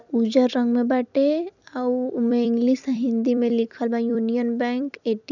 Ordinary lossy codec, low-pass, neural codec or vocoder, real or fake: none; 7.2 kHz; vocoder, 44.1 kHz, 128 mel bands every 256 samples, BigVGAN v2; fake